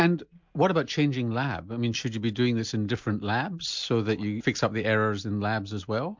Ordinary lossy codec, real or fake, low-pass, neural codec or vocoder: MP3, 64 kbps; real; 7.2 kHz; none